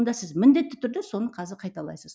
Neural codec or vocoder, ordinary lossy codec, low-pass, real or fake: none; none; none; real